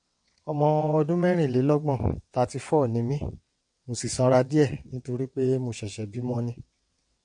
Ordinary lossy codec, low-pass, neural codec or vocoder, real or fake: MP3, 48 kbps; 9.9 kHz; vocoder, 22.05 kHz, 80 mel bands, WaveNeXt; fake